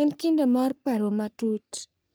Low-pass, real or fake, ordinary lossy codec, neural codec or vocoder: none; fake; none; codec, 44.1 kHz, 3.4 kbps, Pupu-Codec